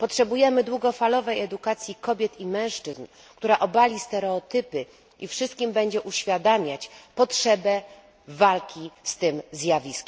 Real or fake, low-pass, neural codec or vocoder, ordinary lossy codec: real; none; none; none